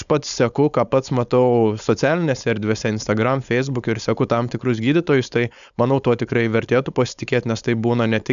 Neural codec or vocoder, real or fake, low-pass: codec, 16 kHz, 4.8 kbps, FACodec; fake; 7.2 kHz